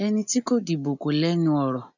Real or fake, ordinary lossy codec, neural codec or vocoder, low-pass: real; none; none; 7.2 kHz